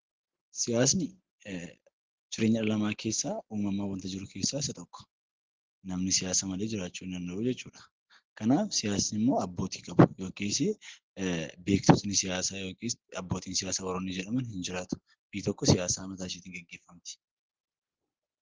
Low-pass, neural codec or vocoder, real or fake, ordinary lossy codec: 7.2 kHz; none; real; Opus, 16 kbps